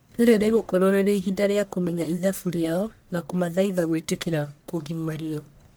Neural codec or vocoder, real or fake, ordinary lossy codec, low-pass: codec, 44.1 kHz, 1.7 kbps, Pupu-Codec; fake; none; none